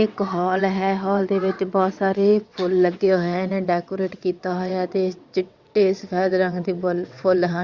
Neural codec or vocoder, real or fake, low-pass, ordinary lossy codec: vocoder, 22.05 kHz, 80 mel bands, WaveNeXt; fake; 7.2 kHz; Opus, 64 kbps